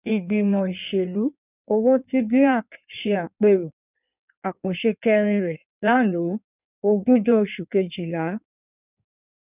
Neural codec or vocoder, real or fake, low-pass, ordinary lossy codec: codec, 16 kHz in and 24 kHz out, 1.1 kbps, FireRedTTS-2 codec; fake; 3.6 kHz; none